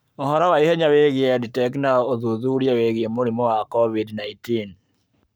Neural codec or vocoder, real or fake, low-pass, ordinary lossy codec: codec, 44.1 kHz, 7.8 kbps, Pupu-Codec; fake; none; none